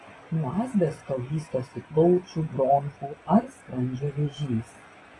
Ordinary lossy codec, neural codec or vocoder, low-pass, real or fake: AAC, 32 kbps; vocoder, 24 kHz, 100 mel bands, Vocos; 10.8 kHz; fake